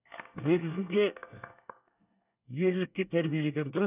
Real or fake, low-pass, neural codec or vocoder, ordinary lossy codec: fake; 3.6 kHz; codec, 24 kHz, 1 kbps, SNAC; none